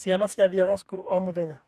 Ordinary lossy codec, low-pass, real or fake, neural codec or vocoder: none; 14.4 kHz; fake; codec, 44.1 kHz, 2.6 kbps, DAC